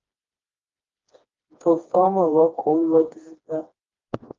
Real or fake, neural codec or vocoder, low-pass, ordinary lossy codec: fake; codec, 16 kHz, 2 kbps, FreqCodec, smaller model; 7.2 kHz; Opus, 32 kbps